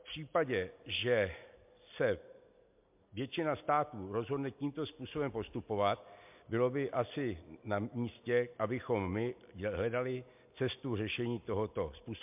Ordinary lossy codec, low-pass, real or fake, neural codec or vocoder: MP3, 32 kbps; 3.6 kHz; real; none